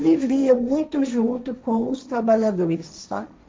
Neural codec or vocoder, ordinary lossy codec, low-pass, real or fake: codec, 16 kHz, 1.1 kbps, Voila-Tokenizer; none; none; fake